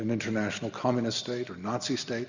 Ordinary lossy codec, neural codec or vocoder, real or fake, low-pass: Opus, 64 kbps; none; real; 7.2 kHz